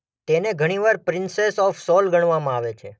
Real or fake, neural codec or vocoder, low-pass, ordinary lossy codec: real; none; none; none